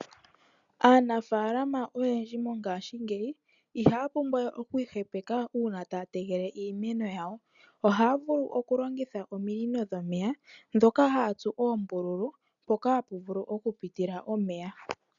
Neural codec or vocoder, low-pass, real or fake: none; 7.2 kHz; real